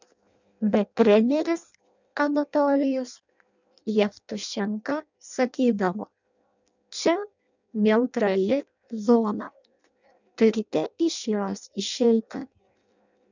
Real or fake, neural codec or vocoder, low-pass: fake; codec, 16 kHz in and 24 kHz out, 0.6 kbps, FireRedTTS-2 codec; 7.2 kHz